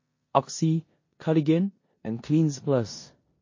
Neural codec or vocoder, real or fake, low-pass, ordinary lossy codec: codec, 16 kHz in and 24 kHz out, 0.9 kbps, LongCat-Audio-Codec, four codebook decoder; fake; 7.2 kHz; MP3, 32 kbps